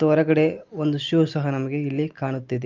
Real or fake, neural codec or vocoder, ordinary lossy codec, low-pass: real; none; Opus, 32 kbps; 7.2 kHz